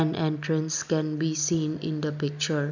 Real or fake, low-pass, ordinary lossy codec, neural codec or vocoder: real; 7.2 kHz; none; none